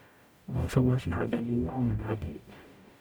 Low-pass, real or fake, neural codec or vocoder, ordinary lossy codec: none; fake; codec, 44.1 kHz, 0.9 kbps, DAC; none